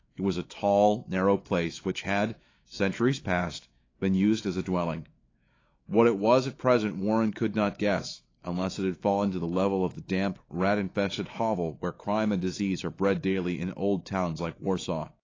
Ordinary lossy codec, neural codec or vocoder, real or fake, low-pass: AAC, 32 kbps; autoencoder, 48 kHz, 128 numbers a frame, DAC-VAE, trained on Japanese speech; fake; 7.2 kHz